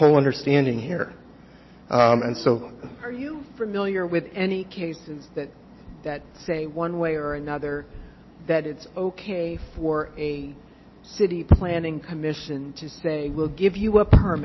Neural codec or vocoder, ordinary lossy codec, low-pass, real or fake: none; MP3, 24 kbps; 7.2 kHz; real